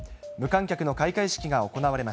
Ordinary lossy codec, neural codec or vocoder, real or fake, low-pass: none; none; real; none